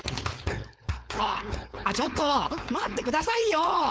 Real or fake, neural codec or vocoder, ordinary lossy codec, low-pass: fake; codec, 16 kHz, 4.8 kbps, FACodec; none; none